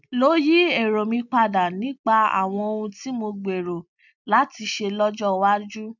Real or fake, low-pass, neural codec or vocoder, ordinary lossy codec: real; 7.2 kHz; none; none